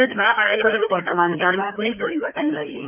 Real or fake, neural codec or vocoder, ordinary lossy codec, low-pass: fake; codec, 16 kHz, 2 kbps, FreqCodec, larger model; none; 3.6 kHz